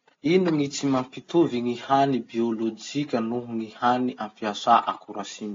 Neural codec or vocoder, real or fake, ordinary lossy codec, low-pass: none; real; AAC, 32 kbps; 7.2 kHz